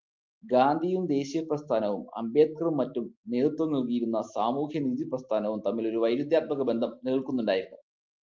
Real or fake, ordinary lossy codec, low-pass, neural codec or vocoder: real; Opus, 24 kbps; 7.2 kHz; none